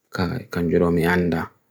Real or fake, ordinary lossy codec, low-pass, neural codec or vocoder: real; none; none; none